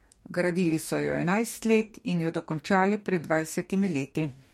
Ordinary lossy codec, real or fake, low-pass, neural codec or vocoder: MP3, 64 kbps; fake; 19.8 kHz; codec, 44.1 kHz, 2.6 kbps, DAC